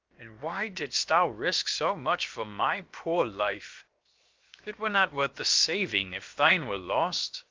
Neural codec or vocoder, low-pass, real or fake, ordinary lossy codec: codec, 16 kHz, 0.7 kbps, FocalCodec; 7.2 kHz; fake; Opus, 24 kbps